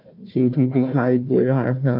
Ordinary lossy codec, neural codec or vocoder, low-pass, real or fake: none; codec, 16 kHz, 1 kbps, FunCodec, trained on Chinese and English, 50 frames a second; 5.4 kHz; fake